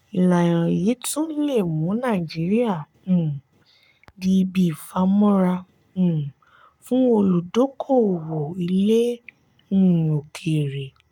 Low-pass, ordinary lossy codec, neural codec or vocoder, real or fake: 19.8 kHz; none; codec, 44.1 kHz, 7.8 kbps, Pupu-Codec; fake